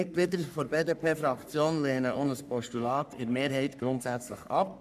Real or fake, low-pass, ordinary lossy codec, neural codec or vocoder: fake; 14.4 kHz; none; codec, 44.1 kHz, 3.4 kbps, Pupu-Codec